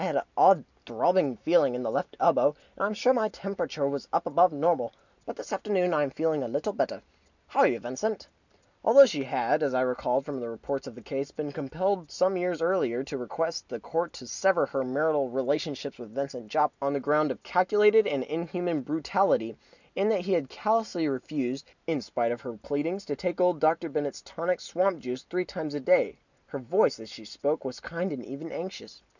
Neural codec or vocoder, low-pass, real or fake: none; 7.2 kHz; real